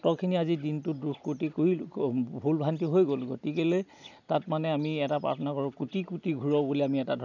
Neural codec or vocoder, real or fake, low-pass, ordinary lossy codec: none; real; 7.2 kHz; none